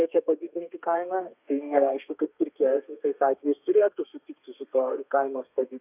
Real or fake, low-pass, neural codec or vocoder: fake; 3.6 kHz; codec, 32 kHz, 1.9 kbps, SNAC